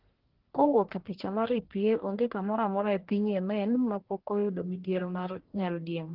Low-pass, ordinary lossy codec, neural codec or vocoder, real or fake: 5.4 kHz; Opus, 16 kbps; codec, 44.1 kHz, 1.7 kbps, Pupu-Codec; fake